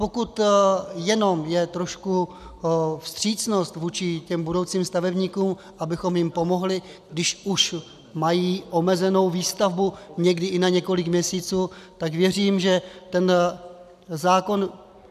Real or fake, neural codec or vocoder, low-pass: real; none; 14.4 kHz